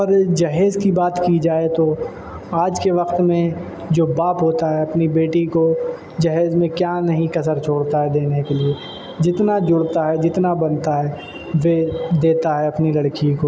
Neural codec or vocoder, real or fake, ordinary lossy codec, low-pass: none; real; none; none